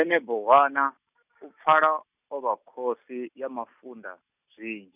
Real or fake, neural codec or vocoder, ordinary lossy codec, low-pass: real; none; none; 3.6 kHz